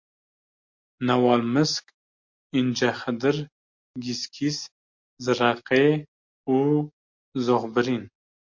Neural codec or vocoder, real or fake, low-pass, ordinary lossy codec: none; real; 7.2 kHz; MP3, 48 kbps